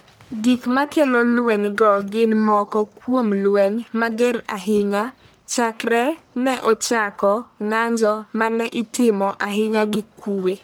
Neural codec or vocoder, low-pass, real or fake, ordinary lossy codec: codec, 44.1 kHz, 1.7 kbps, Pupu-Codec; none; fake; none